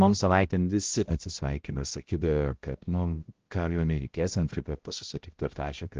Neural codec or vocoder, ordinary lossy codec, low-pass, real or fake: codec, 16 kHz, 0.5 kbps, X-Codec, HuBERT features, trained on balanced general audio; Opus, 16 kbps; 7.2 kHz; fake